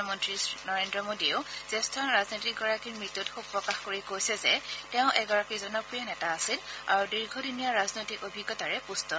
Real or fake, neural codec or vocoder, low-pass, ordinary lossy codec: real; none; none; none